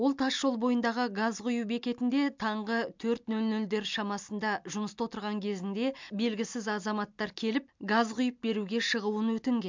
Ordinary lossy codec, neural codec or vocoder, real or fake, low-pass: MP3, 64 kbps; none; real; 7.2 kHz